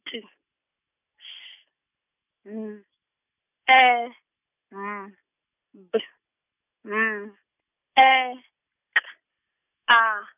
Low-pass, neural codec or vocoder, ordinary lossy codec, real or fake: 3.6 kHz; none; none; real